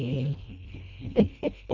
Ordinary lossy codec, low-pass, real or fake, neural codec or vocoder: none; 7.2 kHz; fake; codec, 24 kHz, 1.5 kbps, HILCodec